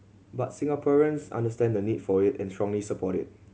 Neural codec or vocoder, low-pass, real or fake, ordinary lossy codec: none; none; real; none